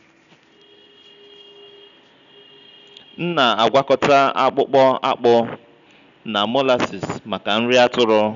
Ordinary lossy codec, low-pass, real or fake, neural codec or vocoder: none; 7.2 kHz; real; none